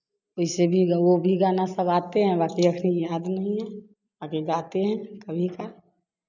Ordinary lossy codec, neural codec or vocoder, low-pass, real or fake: none; none; 7.2 kHz; real